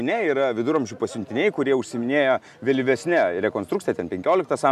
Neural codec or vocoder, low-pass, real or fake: none; 14.4 kHz; real